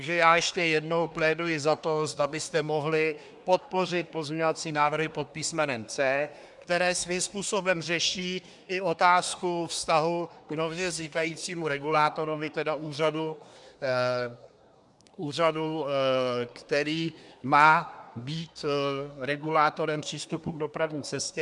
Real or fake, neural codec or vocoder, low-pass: fake; codec, 24 kHz, 1 kbps, SNAC; 10.8 kHz